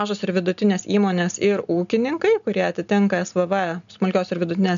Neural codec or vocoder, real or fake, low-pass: none; real; 7.2 kHz